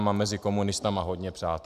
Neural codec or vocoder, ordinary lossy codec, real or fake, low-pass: none; Opus, 64 kbps; real; 14.4 kHz